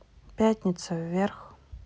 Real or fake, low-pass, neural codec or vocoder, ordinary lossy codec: real; none; none; none